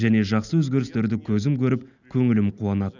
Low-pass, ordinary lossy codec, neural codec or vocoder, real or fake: 7.2 kHz; none; none; real